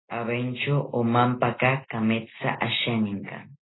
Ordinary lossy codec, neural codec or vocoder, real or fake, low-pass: AAC, 16 kbps; none; real; 7.2 kHz